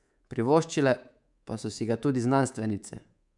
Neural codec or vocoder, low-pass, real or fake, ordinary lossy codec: codec, 24 kHz, 3.1 kbps, DualCodec; 10.8 kHz; fake; none